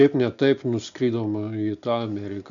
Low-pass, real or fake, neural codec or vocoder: 7.2 kHz; real; none